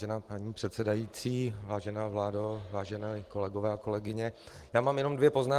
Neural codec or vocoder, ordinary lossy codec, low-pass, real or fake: none; Opus, 24 kbps; 14.4 kHz; real